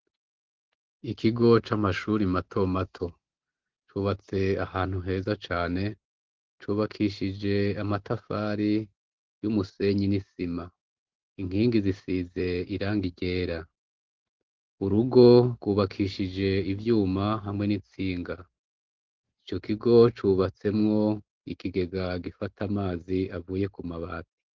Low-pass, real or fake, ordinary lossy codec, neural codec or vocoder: 7.2 kHz; real; Opus, 16 kbps; none